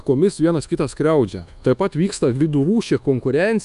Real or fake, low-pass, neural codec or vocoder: fake; 10.8 kHz; codec, 24 kHz, 1.2 kbps, DualCodec